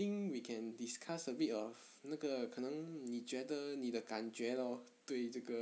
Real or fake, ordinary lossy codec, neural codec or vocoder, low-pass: real; none; none; none